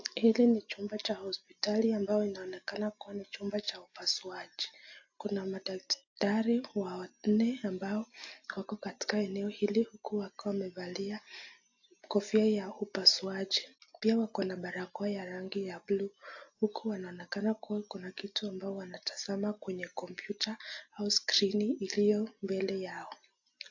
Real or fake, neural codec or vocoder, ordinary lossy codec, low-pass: real; none; AAC, 48 kbps; 7.2 kHz